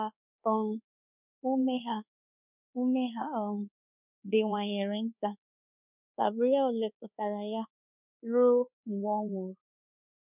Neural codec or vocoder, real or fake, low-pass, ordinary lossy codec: codec, 24 kHz, 1.2 kbps, DualCodec; fake; 3.6 kHz; none